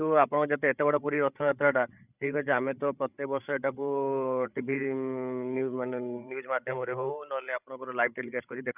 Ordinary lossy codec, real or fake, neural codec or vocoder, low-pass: none; fake; codec, 16 kHz, 16 kbps, FreqCodec, larger model; 3.6 kHz